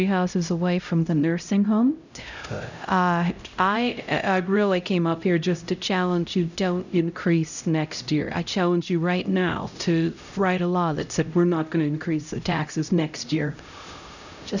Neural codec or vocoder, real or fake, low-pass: codec, 16 kHz, 0.5 kbps, X-Codec, HuBERT features, trained on LibriSpeech; fake; 7.2 kHz